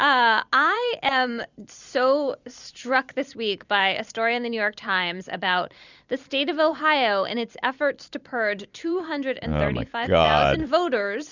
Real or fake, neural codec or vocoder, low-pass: fake; vocoder, 44.1 kHz, 128 mel bands every 256 samples, BigVGAN v2; 7.2 kHz